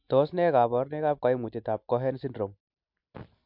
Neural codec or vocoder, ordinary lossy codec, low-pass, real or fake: none; MP3, 48 kbps; 5.4 kHz; real